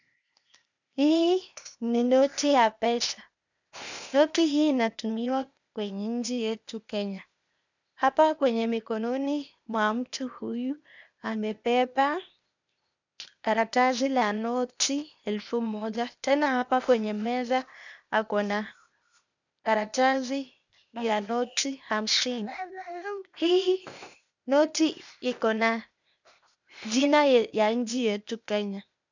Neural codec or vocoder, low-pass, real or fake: codec, 16 kHz, 0.8 kbps, ZipCodec; 7.2 kHz; fake